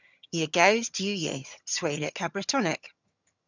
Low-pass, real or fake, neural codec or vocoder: 7.2 kHz; fake; vocoder, 22.05 kHz, 80 mel bands, HiFi-GAN